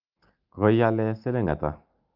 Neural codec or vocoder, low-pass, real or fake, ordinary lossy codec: none; 5.4 kHz; real; Opus, 24 kbps